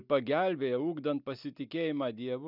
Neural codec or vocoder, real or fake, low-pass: none; real; 5.4 kHz